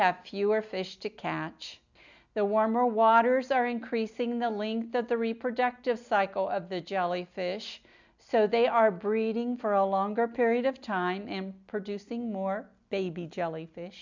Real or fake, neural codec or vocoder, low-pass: real; none; 7.2 kHz